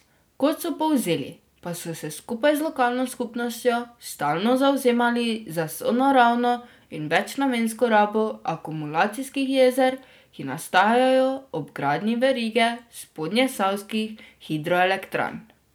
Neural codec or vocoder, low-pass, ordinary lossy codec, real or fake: none; none; none; real